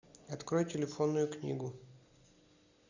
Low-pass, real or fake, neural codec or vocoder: 7.2 kHz; real; none